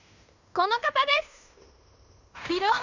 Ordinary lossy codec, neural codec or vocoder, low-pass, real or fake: none; codec, 16 kHz in and 24 kHz out, 0.9 kbps, LongCat-Audio-Codec, fine tuned four codebook decoder; 7.2 kHz; fake